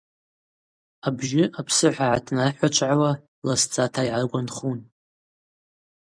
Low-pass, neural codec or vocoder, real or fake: 9.9 kHz; vocoder, 44.1 kHz, 128 mel bands every 256 samples, BigVGAN v2; fake